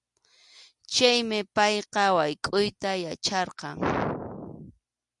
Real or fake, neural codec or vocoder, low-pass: real; none; 10.8 kHz